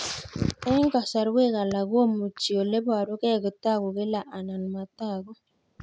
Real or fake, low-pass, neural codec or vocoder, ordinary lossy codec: real; none; none; none